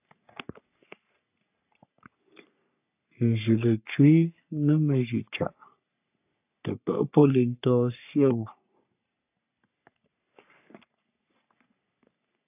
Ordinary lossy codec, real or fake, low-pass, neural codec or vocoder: AAC, 32 kbps; fake; 3.6 kHz; codec, 44.1 kHz, 3.4 kbps, Pupu-Codec